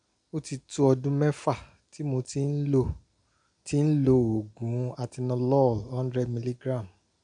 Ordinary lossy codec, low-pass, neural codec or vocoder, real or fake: none; 9.9 kHz; none; real